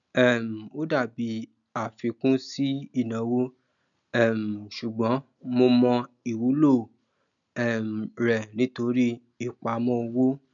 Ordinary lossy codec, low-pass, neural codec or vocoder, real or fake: none; 7.2 kHz; none; real